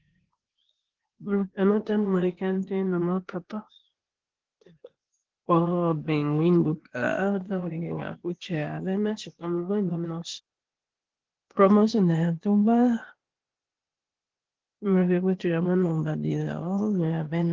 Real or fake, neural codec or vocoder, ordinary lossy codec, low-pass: fake; codec, 16 kHz, 0.8 kbps, ZipCodec; Opus, 16 kbps; 7.2 kHz